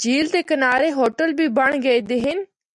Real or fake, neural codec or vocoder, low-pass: real; none; 10.8 kHz